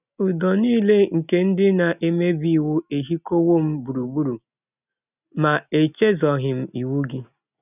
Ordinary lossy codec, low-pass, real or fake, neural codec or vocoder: none; 3.6 kHz; real; none